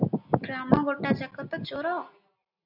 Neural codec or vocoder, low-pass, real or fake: none; 5.4 kHz; real